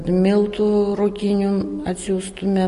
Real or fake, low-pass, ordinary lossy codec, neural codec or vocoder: real; 10.8 kHz; MP3, 48 kbps; none